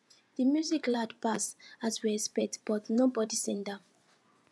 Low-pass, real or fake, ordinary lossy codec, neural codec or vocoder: none; fake; none; vocoder, 24 kHz, 100 mel bands, Vocos